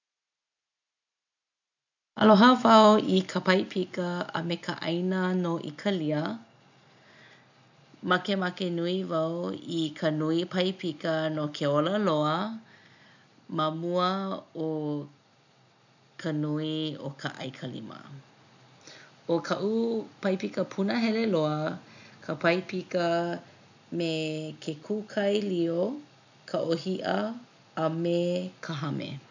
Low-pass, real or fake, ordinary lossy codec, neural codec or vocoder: 7.2 kHz; real; none; none